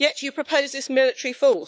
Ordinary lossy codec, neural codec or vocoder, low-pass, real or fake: none; codec, 16 kHz, 4 kbps, X-Codec, WavLM features, trained on Multilingual LibriSpeech; none; fake